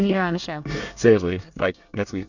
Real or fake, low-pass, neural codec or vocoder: fake; 7.2 kHz; codec, 24 kHz, 1 kbps, SNAC